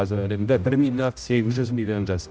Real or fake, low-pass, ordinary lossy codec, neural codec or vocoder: fake; none; none; codec, 16 kHz, 0.5 kbps, X-Codec, HuBERT features, trained on general audio